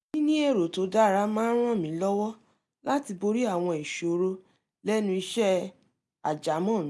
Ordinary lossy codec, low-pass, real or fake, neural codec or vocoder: none; none; real; none